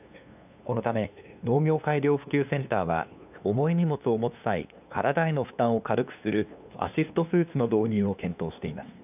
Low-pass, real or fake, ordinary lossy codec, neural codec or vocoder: 3.6 kHz; fake; none; codec, 16 kHz, 2 kbps, FunCodec, trained on LibriTTS, 25 frames a second